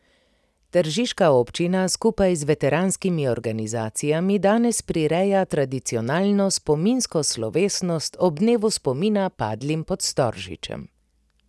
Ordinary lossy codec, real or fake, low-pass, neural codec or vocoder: none; real; none; none